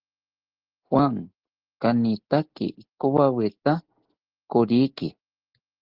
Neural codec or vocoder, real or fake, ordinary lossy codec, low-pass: none; real; Opus, 16 kbps; 5.4 kHz